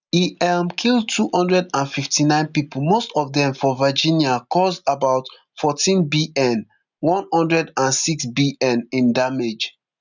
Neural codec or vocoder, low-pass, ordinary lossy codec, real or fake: none; 7.2 kHz; none; real